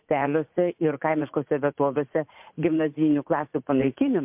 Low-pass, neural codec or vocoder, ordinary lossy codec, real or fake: 3.6 kHz; vocoder, 44.1 kHz, 80 mel bands, Vocos; MP3, 32 kbps; fake